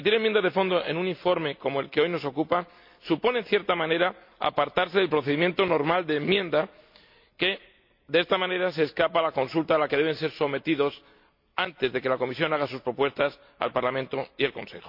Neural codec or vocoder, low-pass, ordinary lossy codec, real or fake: none; 5.4 kHz; MP3, 48 kbps; real